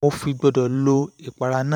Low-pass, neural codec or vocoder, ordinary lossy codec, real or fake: 19.8 kHz; vocoder, 44.1 kHz, 128 mel bands every 512 samples, BigVGAN v2; none; fake